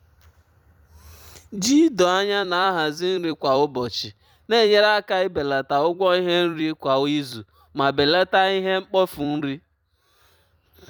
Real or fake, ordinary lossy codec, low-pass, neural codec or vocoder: fake; none; 19.8 kHz; vocoder, 44.1 kHz, 128 mel bands every 256 samples, BigVGAN v2